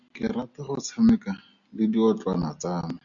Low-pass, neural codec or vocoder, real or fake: 7.2 kHz; none; real